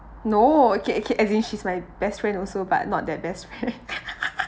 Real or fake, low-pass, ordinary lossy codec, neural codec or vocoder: real; none; none; none